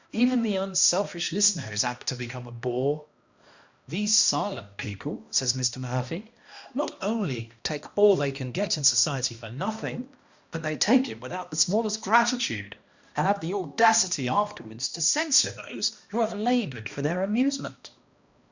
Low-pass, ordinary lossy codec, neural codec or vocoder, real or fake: 7.2 kHz; Opus, 64 kbps; codec, 16 kHz, 1 kbps, X-Codec, HuBERT features, trained on balanced general audio; fake